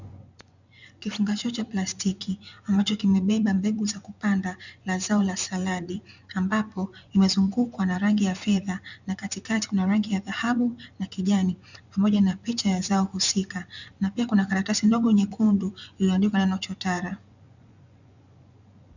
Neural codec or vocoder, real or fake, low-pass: vocoder, 24 kHz, 100 mel bands, Vocos; fake; 7.2 kHz